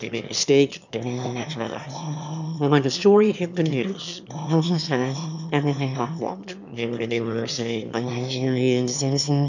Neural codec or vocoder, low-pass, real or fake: autoencoder, 22.05 kHz, a latent of 192 numbers a frame, VITS, trained on one speaker; 7.2 kHz; fake